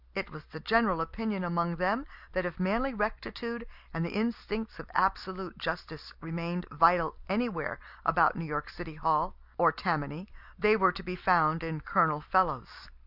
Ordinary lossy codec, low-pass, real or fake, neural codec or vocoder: Opus, 64 kbps; 5.4 kHz; real; none